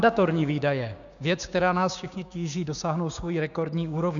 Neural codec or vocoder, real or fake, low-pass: codec, 16 kHz, 6 kbps, DAC; fake; 7.2 kHz